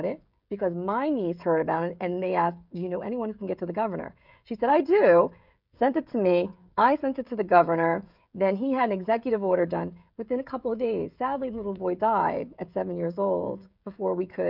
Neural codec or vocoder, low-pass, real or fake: codec, 16 kHz, 16 kbps, FreqCodec, smaller model; 5.4 kHz; fake